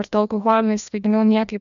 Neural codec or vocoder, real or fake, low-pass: codec, 16 kHz, 1 kbps, FreqCodec, larger model; fake; 7.2 kHz